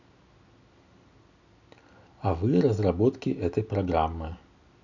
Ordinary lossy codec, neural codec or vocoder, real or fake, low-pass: none; none; real; 7.2 kHz